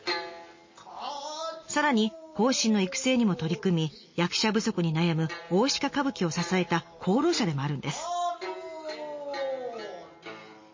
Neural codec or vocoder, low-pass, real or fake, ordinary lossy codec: none; 7.2 kHz; real; MP3, 32 kbps